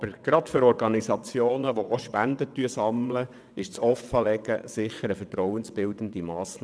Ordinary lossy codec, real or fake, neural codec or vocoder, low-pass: none; fake; vocoder, 22.05 kHz, 80 mel bands, WaveNeXt; none